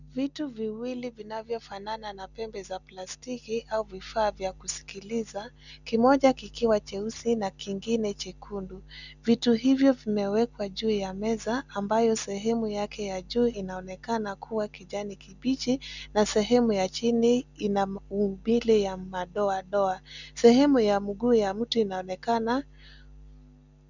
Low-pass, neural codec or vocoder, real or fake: 7.2 kHz; none; real